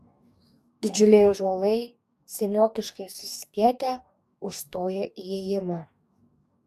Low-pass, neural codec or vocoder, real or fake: 14.4 kHz; codec, 44.1 kHz, 2.6 kbps, DAC; fake